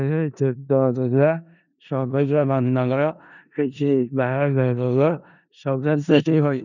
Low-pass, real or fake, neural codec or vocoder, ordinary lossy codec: 7.2 kHz; fake; codec, 16 kHz in and 24 kHz out, 0.4 kbps, LongCat-Audio-Codec, four codebook decoder; none